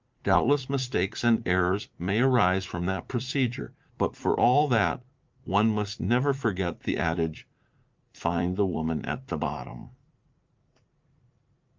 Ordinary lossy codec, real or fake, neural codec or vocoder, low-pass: Opus, 24 kbps; fake; vocoder, 44.1 kHz, 80 mel bands, Vocos; 7.2 kHz